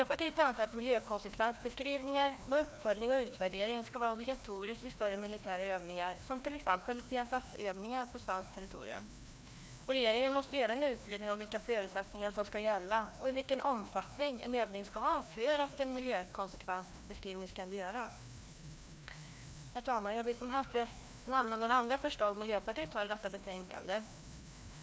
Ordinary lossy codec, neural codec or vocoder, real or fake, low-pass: none; codec, 16 kHz, 1 kbps, FreqCodec, larger model; fake; none